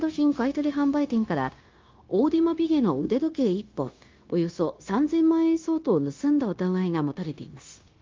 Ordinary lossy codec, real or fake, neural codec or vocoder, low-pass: Opus, 32 kbps; fake; codec, 16 kHz, 0.9 kbps, LongCat-Audio-Codec; 7.2 kHz